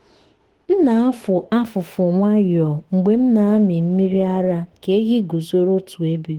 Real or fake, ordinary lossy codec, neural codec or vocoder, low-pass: fake; Opus, 16 kbps; autoencoder, 48 kHz, 32 numbers a frame, DAC-VAE, trained on Japanese speech; 19.8 kHz